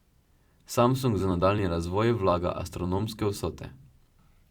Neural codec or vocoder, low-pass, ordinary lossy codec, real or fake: vocoder, 44.1 kHz, 128 mel bands every 256 samples, BigVGAN v2; 19.8 kHz; Opus, 64 kbps; fake